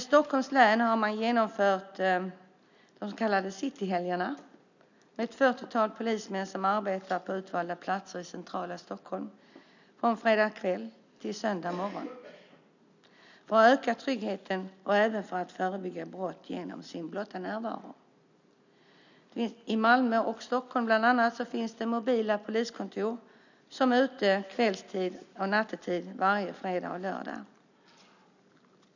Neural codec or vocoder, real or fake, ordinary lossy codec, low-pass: none; real; none; 7.2 kHz